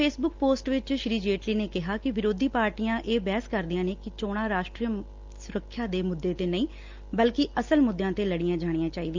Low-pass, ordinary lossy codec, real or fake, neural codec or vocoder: 7.2 kHz; Opus, 32 kbps; real; none